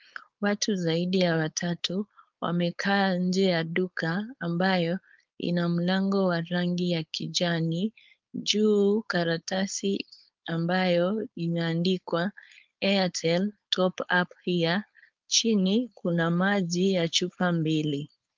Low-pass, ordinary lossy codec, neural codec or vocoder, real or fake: 7.2 kHz; Opus, 24 kbps; codec, 16 kHz, 4.8 kbps, FACodec; fake